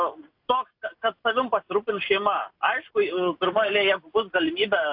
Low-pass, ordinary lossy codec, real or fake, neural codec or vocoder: 5.4 kHz; AAC, 48 kbps; real; none